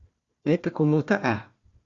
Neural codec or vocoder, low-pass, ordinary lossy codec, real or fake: codec, 16 kHz, 1 kbps, FunCodec, trained on Chinese and English, 50 frames a second; 7.2 kHz; Opus, 64 kbps; fake